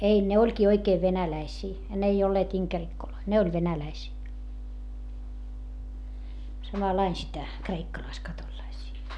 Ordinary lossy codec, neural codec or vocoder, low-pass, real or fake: none; none; none; real